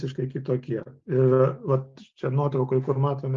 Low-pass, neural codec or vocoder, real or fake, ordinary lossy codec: 7.2 kHz; none; real; Opus, 32 kbps